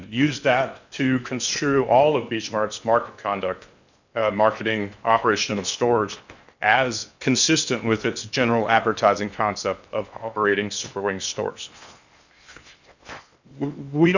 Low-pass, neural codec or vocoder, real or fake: 7.2 kHz; codec, 16 kHz in and 24 kHz out, 0.8 kbps, FocalCodec, streaming, 65536 codes; fake